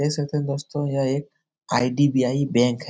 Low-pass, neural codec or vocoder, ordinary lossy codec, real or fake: none; none; none; real